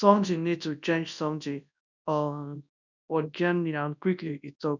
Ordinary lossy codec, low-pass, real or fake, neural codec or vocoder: none; 7.2 kHz; fake; codec, 24 kHz, 0.9 kbps, WavTokenizer, large speech release